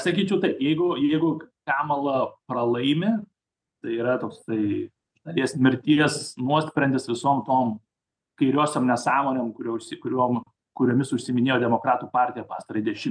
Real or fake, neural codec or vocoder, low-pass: real; none; 9.9 kHz